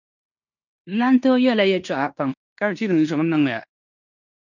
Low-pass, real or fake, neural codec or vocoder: 7.2 kHz; fake; codec, 16 kHz in and 24 kHz out, 0.9 kbps, LongCat-Audio-Codec, fine tuned four codebook decoder